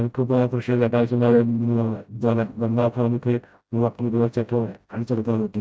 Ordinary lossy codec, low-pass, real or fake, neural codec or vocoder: none; none; fake; codec, 16 kHz, 0.5 kbps, FreqCodec, smaller model